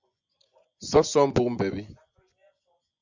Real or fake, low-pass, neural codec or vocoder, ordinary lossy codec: fake; 7.2 kHz; vocoder, 24 kHz, 100 mel bands, Vocos; Opus, 64 kbps